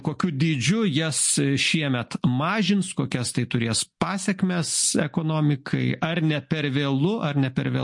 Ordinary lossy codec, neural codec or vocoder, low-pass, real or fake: MP3, 48 kbps; none; 10.8 kHz; real